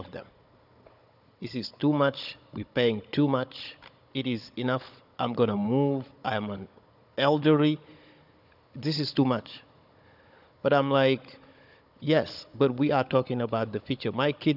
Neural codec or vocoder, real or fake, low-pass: codec, 16 kHz, 16 kbps, FunCodec, trained on Chinese and English, 50 frames a second; fake; 5.4 kHz